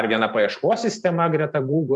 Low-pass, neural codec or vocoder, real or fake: 10.8 kHz; vocoder, 44.1 kHz, 128 mel bands every 512 samples, BigVGAN v2; fake